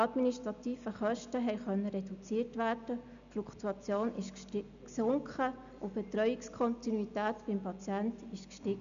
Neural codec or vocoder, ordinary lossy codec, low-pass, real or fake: none; none; 7.2 kHz; real